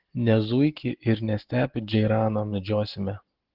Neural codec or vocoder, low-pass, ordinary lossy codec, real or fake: none; 5.4 kHz; Opus, 16 kbps; real